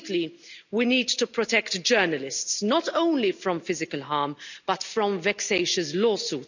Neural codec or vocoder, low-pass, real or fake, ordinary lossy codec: none; 7.2 kHz; real; none